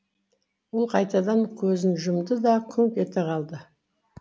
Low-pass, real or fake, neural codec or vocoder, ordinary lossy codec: none; real; none; none